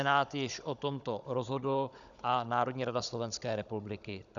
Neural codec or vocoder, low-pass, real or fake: codec, 16 kHz, 16 kbps, FunCodec, trained on Chinese and English, 50 frames a second; 7.2 kHz; fake